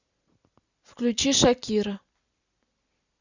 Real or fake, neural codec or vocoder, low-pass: fake; vocoder, 44.1 kHz, 128 mel bands every 256 samples, BigVGAN v2; 7.2 kHz